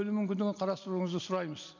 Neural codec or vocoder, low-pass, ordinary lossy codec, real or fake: none; 7.2 kHz; none; real